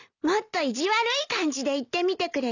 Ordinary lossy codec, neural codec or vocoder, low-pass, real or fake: none; none; 7.2 kHz; real